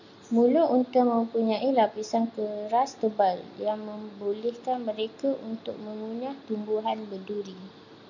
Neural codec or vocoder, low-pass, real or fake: none; 7.2 kHz; real